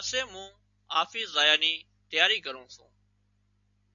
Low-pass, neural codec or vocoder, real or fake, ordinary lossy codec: 7.2 kHz; none; real; AAC, 64 kbps